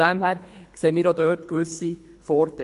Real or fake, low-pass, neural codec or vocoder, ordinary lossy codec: fake; 10.8 kHz; codec, 24 kHz, 3 kbps, HILCodec; AAC, 64 kbps